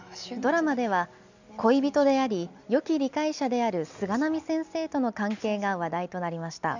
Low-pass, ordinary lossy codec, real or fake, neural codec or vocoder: 7.2 kHz; none; real; none